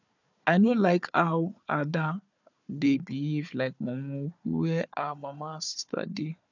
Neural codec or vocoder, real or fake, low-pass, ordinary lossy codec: codec, 16 kHz, 4 kbps, FunCodec, trained on Chinese and English, 50 frames a second; fake; 7.2 kHz; none